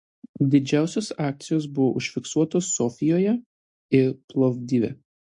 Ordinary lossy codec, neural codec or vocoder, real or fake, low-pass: MP3, 48 kbps; none; real; 10.8 kHz